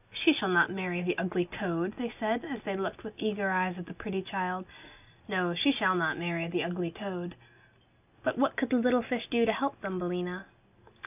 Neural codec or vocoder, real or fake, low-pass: none; real; 3.6 kHz